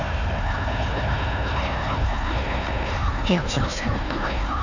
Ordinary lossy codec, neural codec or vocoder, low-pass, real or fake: none; codec, 16 kHz, 1 kbps, FunCodec, trained on Chinese and English, 50 frames a second; 7.2 kHz; fake